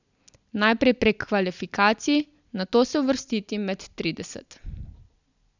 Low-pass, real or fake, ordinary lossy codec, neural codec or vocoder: 7.2 kHz; real; none; none